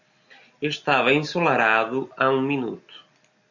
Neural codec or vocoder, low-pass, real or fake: none; 7.2 kHz; real